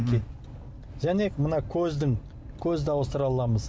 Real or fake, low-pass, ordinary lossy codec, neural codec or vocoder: real; none; none; none